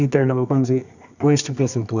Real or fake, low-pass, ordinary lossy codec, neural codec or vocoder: fake; 7.2 kHz; none; codec, 24 kHz, 0.9 kbps, WavTokenizer, medium music audio release